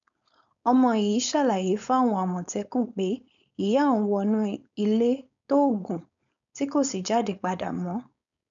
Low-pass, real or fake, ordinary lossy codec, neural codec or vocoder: 7.2 kHz; fake; none; codec, 16 kHz, 4.8 kbps, FACodec